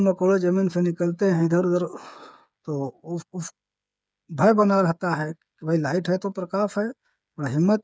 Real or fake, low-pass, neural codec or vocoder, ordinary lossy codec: fake; none; codec, 16 kHz, 8 kbps, FreqCodec, smaller model; none